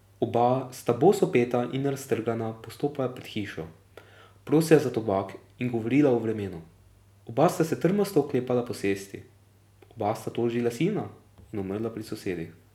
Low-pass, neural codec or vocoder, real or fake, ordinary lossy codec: 19.8 kHz; none; real; none